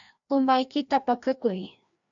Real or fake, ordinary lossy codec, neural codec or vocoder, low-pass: fake; AAC, 64 kbps; codec, 16 kHz, 1 kbps, FreqCodec, larger model; 7.2 kHz